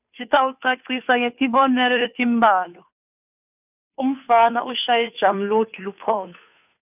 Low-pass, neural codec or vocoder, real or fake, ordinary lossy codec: 3.6 kHz; codec, 16 kHz, 2 kbps, FunCodec, trained on Chinese and English, 25 frames a second; fake; none